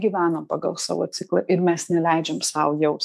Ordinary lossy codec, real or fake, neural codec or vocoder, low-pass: AAC, 96 kbps; fake; autoencoder, 48 kHz, 128 numbers a frame, DAC-VAE, trained on Japanese speech; 14.4 kHz